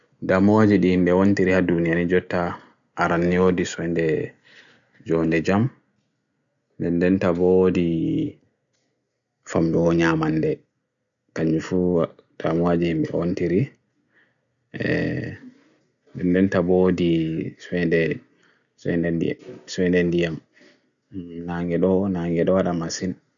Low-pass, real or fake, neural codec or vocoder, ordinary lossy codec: 7.2 kHz; real; none; none